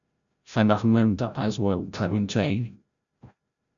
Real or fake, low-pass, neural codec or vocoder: fake; 7.2 kHz; codec, 16 kHz, 0.5 kbps, FreqCodec, larger model